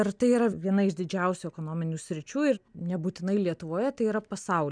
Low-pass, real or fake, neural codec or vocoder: 9.9 kHz; real; none